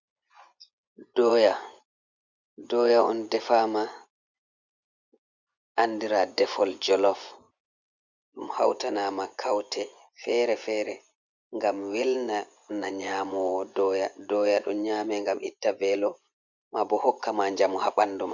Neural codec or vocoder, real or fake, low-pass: vocoder, 24 kHz, 100 mel bands, Vocos; fake; 7.2 kHz